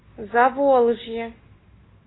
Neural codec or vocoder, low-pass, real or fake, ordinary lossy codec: none; 7.2 kHz; real; AAC, 16 kbps